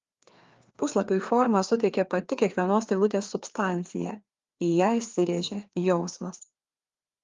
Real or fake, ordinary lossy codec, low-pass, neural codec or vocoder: fake; Opus, 32 kbps; 7.2 kHz; codec, 16 kHz, 2 kbps, FreqCodec, larger model